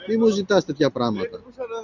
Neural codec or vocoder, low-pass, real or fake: none; 7.2 kHz; real